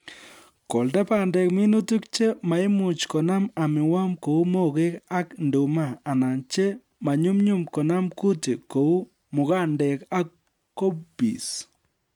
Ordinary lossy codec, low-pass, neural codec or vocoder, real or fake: none; 19.8 kHz; none; real